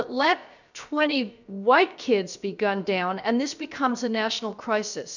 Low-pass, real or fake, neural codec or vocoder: 7.2 kHz; fake; codec, 16 kHz, about 1 kbps, DyCAST, with the encoder's durations